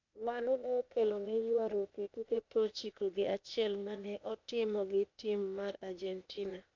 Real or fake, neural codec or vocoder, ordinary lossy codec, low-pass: fake; codec, 16 kHz, 0.8 kbps, ZipCodec; MP3, 64 kbps; 7.2 kHz